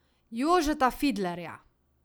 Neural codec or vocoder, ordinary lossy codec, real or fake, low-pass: none; none; real; none